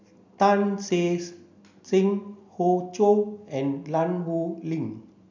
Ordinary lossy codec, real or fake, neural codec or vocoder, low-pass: MP3, 64 kbps; real; none; 7.2 kHz